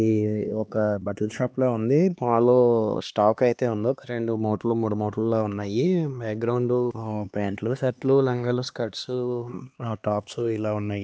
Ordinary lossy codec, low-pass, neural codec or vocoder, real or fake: none; none; codec, 16 kHz, 2 kbps, X-Codec, HuBERT features, trained on LibriSpeech; fake